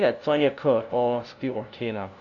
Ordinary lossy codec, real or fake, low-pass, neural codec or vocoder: none; fake; 7.2 kHz; codec, 16 kHz, 0.5 kbps, FunCodec, trained on LibriTTS, 25 frames a second